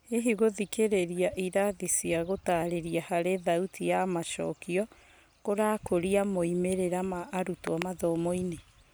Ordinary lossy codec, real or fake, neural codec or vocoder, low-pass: none; real; none; none